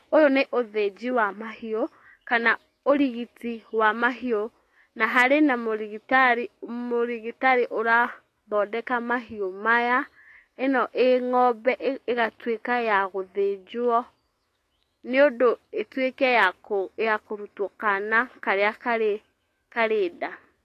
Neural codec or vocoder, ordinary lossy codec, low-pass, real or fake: autoencoder, 48 kHz, 128 numbers a frame, DAC-VAE, trained on Japanese speech; AAC, 48 kbps; 14.4 kHz; fake